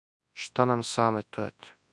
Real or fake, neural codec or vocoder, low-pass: fake; codec, 24 kHz, 0.9 kbps, WavTokenizer, large speech release; 10.8 kHz